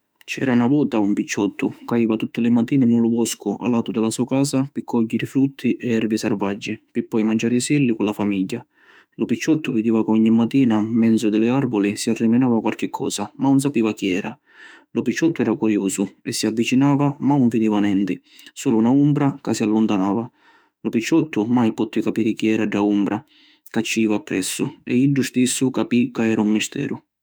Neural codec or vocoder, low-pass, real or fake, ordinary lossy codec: autoencoder, 48 kHz, 32 numbers a frame, DAC-VAE, trained on Japanese speech; none; fake; none